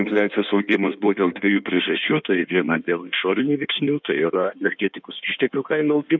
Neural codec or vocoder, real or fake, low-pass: codec, 16 kHz in and 24 kHz out, 1.1 kbps, FireRedTTS-2 codec; fake; 7.2 kHz